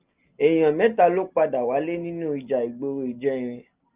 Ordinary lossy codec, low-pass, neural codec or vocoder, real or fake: Opus, 24 kbps; 3.6 kHz; none; real